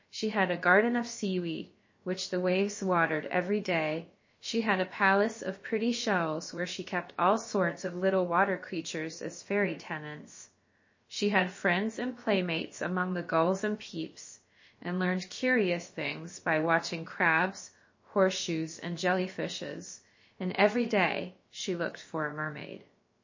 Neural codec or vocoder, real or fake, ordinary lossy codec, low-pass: codec, 16 kHz, about 1 kbps, DyCAST, with the encoder's durations; fake; MP3, 32 kbps; 7.2 kHz